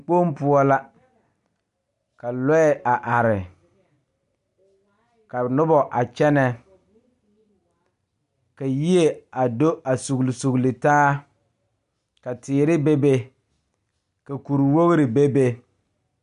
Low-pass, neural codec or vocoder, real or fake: 10.8 kHz; none; real